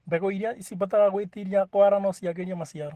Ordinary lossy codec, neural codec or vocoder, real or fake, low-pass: Opus, 16 kbps; none; real; 14.4 kHz